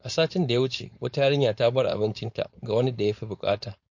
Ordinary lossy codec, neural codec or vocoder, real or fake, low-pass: MP3, 48 kbps; codec, 16 kHz, 4.8 kbps, FACodec; fake; 7.2 kHz